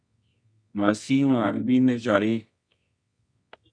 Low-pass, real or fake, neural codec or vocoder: 9.9 kHz; fake; codec, 24 kHz, 0.9 kbps, WavTokenizer, medium music audio release